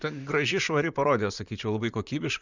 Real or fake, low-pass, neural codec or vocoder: fake; 7.2 kHz; vocoder, 44.1 kHz, 128 mel bands, Pupu-Vocoder